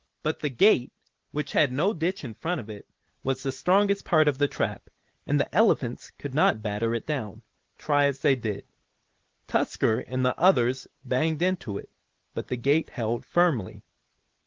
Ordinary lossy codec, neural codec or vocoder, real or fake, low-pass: Opus, 16 kbps; none; real; 7.2 kHz